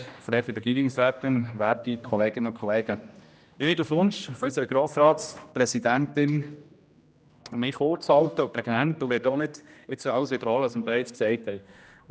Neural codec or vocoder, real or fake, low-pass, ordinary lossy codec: codec, 16 kHz, 1 kbps, X-Codec, HuBERT features, trained on general audio; fake; none; none